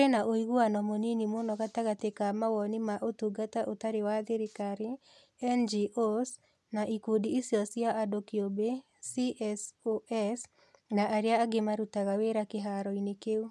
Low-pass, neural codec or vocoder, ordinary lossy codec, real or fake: none; none; none; real